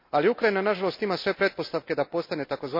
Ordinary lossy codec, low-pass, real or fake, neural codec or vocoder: MP3, 32 kbps; 5.4 kHz; real; none